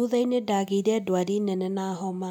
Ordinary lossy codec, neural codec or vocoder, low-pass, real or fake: none; none; 19.8 kHz; real